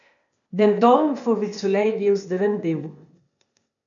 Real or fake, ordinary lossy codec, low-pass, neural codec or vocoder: fake; MP3, 96 kbps; 7.2 kHz; codec, 16 kHz, 0.8 kbps, ZipCodec